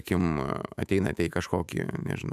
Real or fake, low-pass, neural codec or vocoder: fake; 14.4 kHz; autoencoder, 48 kHz, 128 numbers a frame, DAC-VAE, trained on Japanese speech